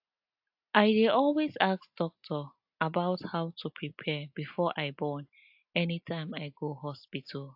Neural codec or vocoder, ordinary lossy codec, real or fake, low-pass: none; AAC, 48 kbps; real; 5.4 kHz